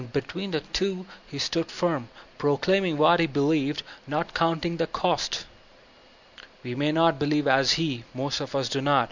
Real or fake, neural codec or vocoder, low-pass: real; none; 7.2 kHz